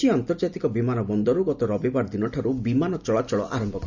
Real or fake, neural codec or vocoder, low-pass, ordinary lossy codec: real; none; 7.2 kHz; Opus, 64 kbps